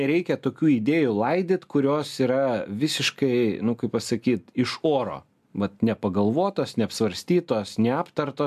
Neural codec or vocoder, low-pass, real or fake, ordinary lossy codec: none; 14.4 kHz; real; AAC, 96 kbps